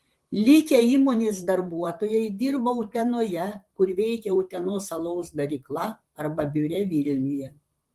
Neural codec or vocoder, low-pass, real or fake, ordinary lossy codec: vocoder, 44.1 kHz, 128 mel bands, Pupu-Vocoder; 14.4 kHz; fake; Opus, 24 kbps